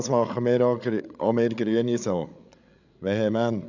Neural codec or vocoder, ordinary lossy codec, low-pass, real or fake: codec, 16 kHz, 8 kbps, FreqCodec, larger model; none; 7.2 kHz; fake